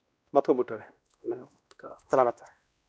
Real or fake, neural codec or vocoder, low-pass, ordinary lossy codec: fake; codec, 16 kHz, 1 kbps, X-Codec, WavLM features, trained on Multilingual LibriSpeech; none; none